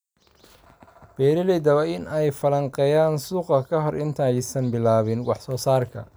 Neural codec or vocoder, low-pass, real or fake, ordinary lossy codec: none; none; real; none